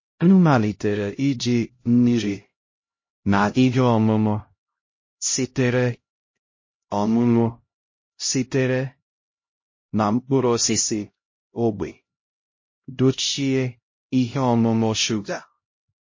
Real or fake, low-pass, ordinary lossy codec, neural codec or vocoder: fake; 7.2 kHz; MP3, 32 kbps; codec, 16 kHz, 0.5 kbps, X-Codec, HuBERT features, trained on LibriSpeech